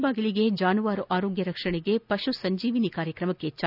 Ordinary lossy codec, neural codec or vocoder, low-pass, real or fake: none; none; 5.4 kHz; real